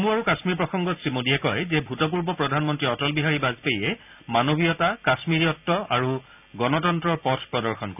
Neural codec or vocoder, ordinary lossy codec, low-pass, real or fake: none; none; 3.6 kHz; real